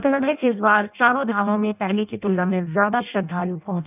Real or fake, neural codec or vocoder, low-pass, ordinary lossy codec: fake; codec, 16 kHz in and 24 kHz out, 0.6 kbps, FireRedTTS-2 codec; 3.6 kHz; none